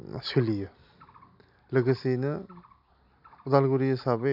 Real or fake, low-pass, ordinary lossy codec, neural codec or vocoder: real; 5.4 kHz; none; none